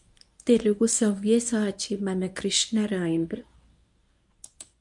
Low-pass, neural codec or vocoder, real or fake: 10.8 kHz; codec, 24 kHz, 0.9 kbps, WavTokenizer, medium speech release version 1; fake